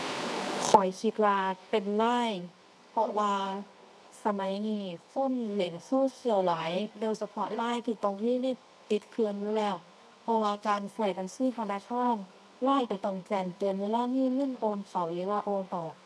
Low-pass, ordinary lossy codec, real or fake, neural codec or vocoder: none; none; fake; codec, 24 kHz, 0.9 kbps, WavTokenizer, medium music audio release